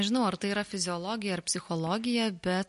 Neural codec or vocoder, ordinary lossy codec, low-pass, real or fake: none; MP3, 48 kbps; 14.4 kHz; real